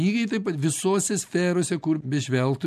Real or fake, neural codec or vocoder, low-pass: real; none; 14.4 kHz